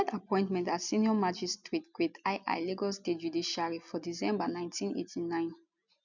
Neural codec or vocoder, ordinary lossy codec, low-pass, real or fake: none; none; 7.2 kHz; real